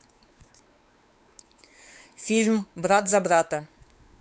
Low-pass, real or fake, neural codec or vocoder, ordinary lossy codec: none; fake; codec, 16 kHz, 4 kbps, X-Codec, WavLM features, trained on Multilingual LibriSpeech; none